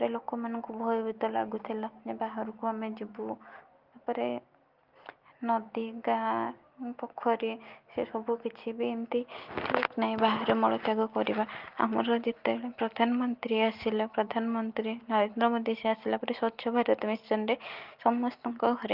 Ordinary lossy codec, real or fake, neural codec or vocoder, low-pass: Opus, 32 kbps; real; none; 5.4 kHz